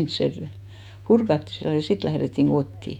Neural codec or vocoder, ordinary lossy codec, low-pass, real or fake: autoencoder, 48 kHz, 128 numbers a frame, DAC-VAE, trained on Japanese speech; none; 19.8 kHz; fake